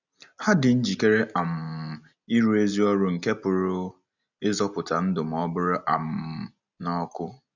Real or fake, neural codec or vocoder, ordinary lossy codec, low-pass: real; none; none; 7.2 kHz